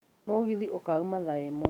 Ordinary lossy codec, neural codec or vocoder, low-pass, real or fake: none; codec, 44.1 kHz, 7.8 kbps, DAC; 19.8 kHz; fake